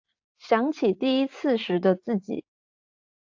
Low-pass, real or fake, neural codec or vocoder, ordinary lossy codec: 7.2 kHz; fake; vocoder, 44.1 kHz, 80 mel bands, Vocos; AAC, 48 kbps